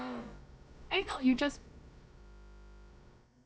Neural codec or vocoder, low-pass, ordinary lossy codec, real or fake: codec, 16 kHz, about 1 kbps, DyCAST, with the encoder's durations; none; none; fake